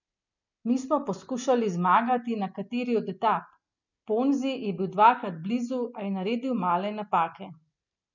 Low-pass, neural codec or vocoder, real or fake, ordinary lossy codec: 7.2 kHz; none; real; none